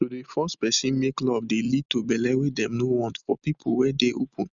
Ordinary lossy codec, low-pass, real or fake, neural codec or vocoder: none; 7.2 kHz; real; none